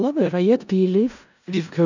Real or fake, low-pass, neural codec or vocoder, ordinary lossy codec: fake; 7.2 kHz; codec, 16 kHz in and 24 kHz out, 0.4 kbps, LongCat-Audio-Codec, four codebook decoder; none